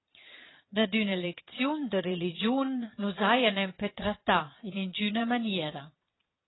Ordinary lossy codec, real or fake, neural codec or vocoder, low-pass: AAC, 16 kbps; fake; vocoder, 22.05 kHz, 80 mel bands, Vocos; 7.2 kHz